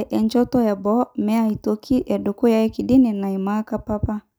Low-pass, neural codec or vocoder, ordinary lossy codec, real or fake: none; none; none; real